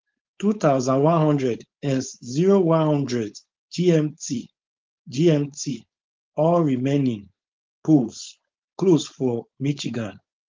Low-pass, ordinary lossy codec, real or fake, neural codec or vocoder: 7.2 kHz; Opus, 32 kbps; fake; codec, 16 kHz, 4.8 kbps, FACodec